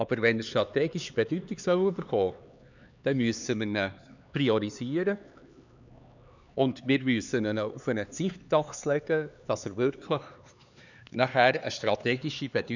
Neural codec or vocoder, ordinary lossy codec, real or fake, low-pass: codec, 16 kHz, 4 kbps, X-Codec, HuBERT features, trained on LibriSpeech; none; fake; 7.2 kHz